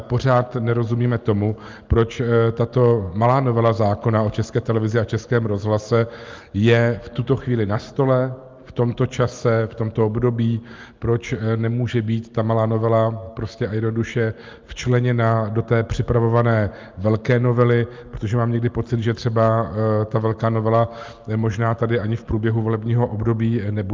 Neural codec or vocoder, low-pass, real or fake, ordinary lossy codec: none; 7.2 kHz; real; Opus, 24 kbps